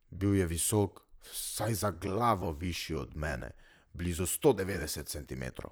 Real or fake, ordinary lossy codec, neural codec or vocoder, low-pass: fake; none; vocoder, 44.1 kHz, 128 mel bands, Pupu-Vocoder; none